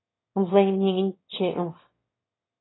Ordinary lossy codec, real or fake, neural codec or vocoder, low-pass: AAC, 16 kbps; fake; autoencoder, 22.05 kHz, a latent of 192 numbers a frame, VITS, trained on one speaker; 7.2 kHz